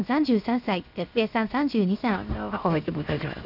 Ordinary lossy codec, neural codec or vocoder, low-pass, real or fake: none; codec, 16 kHz, 0.7 kbps, FocalCodec; 5.4 kHz; fake